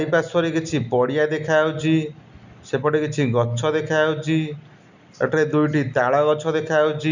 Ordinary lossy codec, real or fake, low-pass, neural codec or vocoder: none; real; 7.2 kHz; none